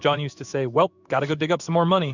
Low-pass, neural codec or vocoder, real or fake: 7.2 kHz; codec, 16 kHz in and 24 kHz out, 1 kbps, XY-Tokenizer; fake